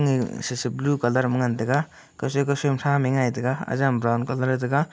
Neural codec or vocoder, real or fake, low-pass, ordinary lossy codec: none; real; none; none